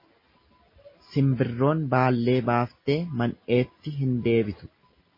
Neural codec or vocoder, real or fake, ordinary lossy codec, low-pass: none; real; MP3, 24 kbps; 5.4 kHz